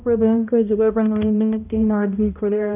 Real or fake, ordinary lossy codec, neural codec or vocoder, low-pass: fake; none; codec, 16 kHz, 0.5 kbps, X-Codec, HuBERT features, trained on balanced general audio; 3.6 kHz